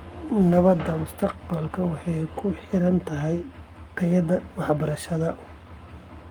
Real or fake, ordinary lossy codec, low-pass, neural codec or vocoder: fake; Opus, 32 kbps; 19.8 kHz; codec, 44.1 kHz, 7.8 kbps, Pupu-Codec